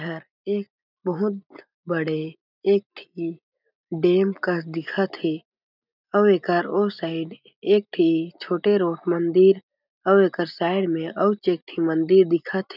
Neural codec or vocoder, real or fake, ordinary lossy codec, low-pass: none; real; none; 5.4 kHz